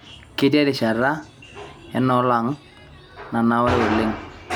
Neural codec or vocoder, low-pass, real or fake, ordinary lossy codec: none; 19.8 kHz; real; none